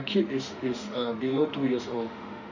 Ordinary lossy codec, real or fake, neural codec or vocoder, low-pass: none; fake; autoencoder, 48 kHz, 32 numbers a frame, DAC-VAE, trained on Japanese speech; 7.2 kHz